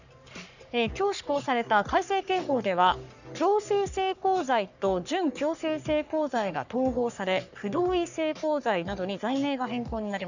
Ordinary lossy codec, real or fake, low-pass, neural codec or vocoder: none; fake; 7.2 kHz; codec, 44.1 kHz, 3.4 kbps, Pupu-Codec